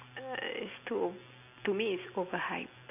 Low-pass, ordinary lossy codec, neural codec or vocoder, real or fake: 3.6 kHz; none; none; real